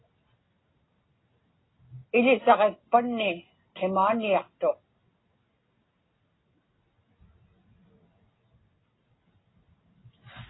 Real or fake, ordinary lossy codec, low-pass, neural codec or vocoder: real; AAC, 16 kbps; 7.2 kHz; none